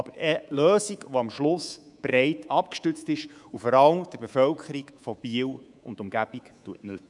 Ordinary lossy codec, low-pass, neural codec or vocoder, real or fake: none; 10.8 kHz; codec, 24 kHz, 3.1 kbps, DualCodec; fake